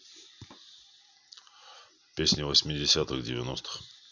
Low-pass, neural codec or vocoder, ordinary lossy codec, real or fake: 7.2 kHz; none; none; real